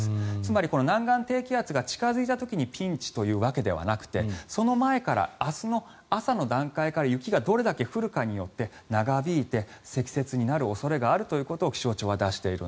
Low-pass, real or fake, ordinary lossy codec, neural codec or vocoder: none; real; none; none